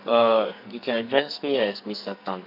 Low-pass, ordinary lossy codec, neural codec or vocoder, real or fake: 5.4 kHz; none; codec, 44.1 kHz, 2.6 kbps, SNAC; fake